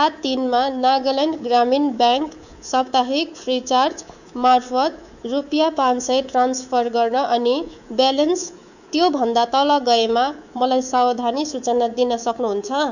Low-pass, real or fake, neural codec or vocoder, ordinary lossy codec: 7.2 kHz; fake; codec, 24 kHz, 3.1 kbps, DualCodec; none